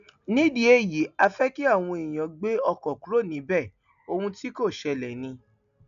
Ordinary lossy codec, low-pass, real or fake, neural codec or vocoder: none; 7.2 kHz; real; none